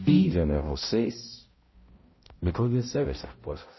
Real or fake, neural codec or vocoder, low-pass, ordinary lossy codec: fake; codec, 16 kHz, 0.5 kbps, X-Codec, HuBERT features, trained on balanced general audio; 7.2 kHz; MP3, 24 kbps